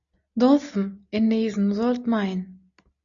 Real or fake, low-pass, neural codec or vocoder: real; 7.2 kHz; none